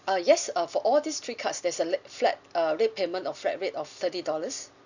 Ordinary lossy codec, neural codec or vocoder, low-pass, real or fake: none; none; 7.2 kHz; real